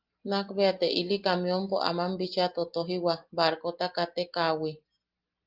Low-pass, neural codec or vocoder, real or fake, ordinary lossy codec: 5.4 kHz; none; real; Opus, 32 kbps